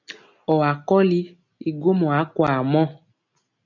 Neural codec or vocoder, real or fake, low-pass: none; real; 7.2 kHz